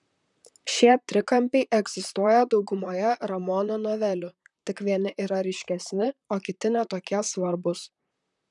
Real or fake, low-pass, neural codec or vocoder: fake; 10.8 kHz; vocoder, 44.1 kHz, 128 mel bands, Pupu-Vocoder